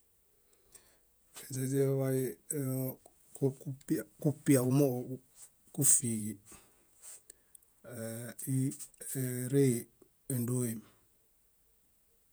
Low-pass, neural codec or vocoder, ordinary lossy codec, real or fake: none; vocoder, 48 kHz, 128 mel bands, Vocos; none; fake